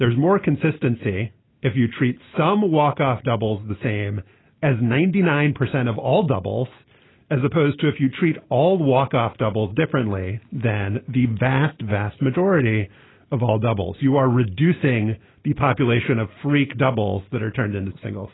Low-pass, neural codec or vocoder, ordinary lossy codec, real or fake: 7.2 kHz; none; AAC, 16 kbps; real